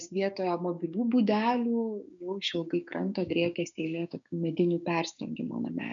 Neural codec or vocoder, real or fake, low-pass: codec, 16 kHz, 6 kbps, DAC; fake; 7.2 kHz